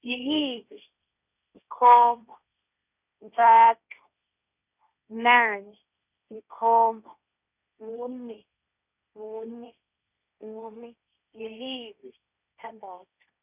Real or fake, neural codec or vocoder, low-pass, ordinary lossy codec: fake; codec, 16 kHz, 1.1 kbps, Voila-Tokenizer; 3.6 kHz; none